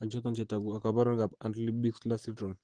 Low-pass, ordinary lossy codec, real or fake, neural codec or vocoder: 9.9 kHz; Opus, 16 kbps; fake; vocoder, 44.1 kHz, 128 mel bands, Pupu-Vocoder